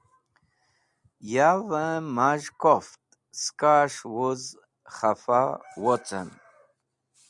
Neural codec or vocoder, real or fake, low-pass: none; real; 10.8 kHz